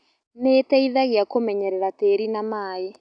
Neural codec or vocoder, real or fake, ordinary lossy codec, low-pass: none; real; none; 9.9 kHz